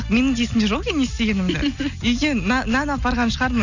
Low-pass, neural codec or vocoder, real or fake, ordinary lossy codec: 7.2 kHz; none; real; none